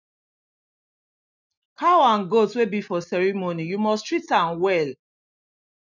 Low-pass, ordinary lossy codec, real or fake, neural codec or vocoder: 7.2 kHz; none; real; none